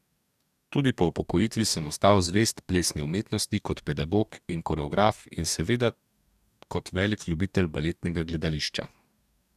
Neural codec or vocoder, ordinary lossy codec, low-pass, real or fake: codec, 44.1 kHz, 2.6 kbps, DAC; none; 14.4 kHz; fake